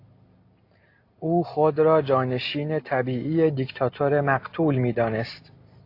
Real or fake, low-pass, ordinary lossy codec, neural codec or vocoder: real; 5.4 kHz; AAC, 32 kbps; none